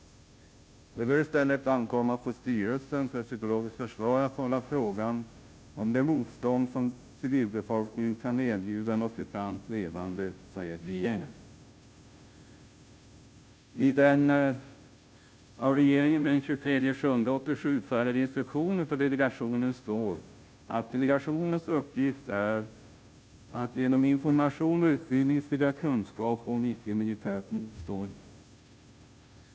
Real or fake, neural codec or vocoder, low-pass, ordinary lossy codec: fake; codec, 16 kHz, 0.5 kbps, FunCodec, trained on Chinese and English, 25 frames a second; none; none